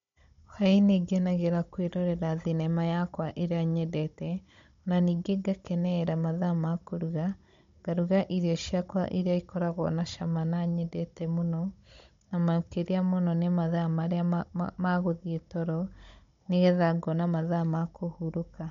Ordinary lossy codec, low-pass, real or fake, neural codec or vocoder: MP3, 48 kbps; 7.2 kHz; fake; codec, 16 kHz, 16 kbps, FunCodec, trained on Chinese and English, 50 frames a second